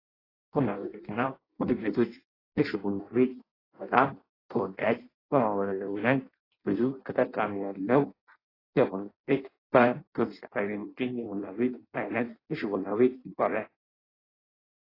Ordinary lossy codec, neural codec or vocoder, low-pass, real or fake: AAC, 24 kbps; codec, 16 kHz in and 24 kHz out, 0.6 kbps, FireRedTTS-2 codec; 5.4 kHz; fake